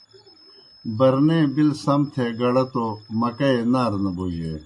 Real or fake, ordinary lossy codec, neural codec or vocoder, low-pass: real; MP3, 48 kbps; none; 10.8 kHz